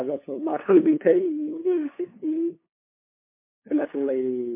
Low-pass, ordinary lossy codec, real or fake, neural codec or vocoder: 3.6 kHz; MP3, 24 kbps; fake; codec, 16 kHz, 2 kbps, FunCodec, trained on LibriTTS, 25 frames a second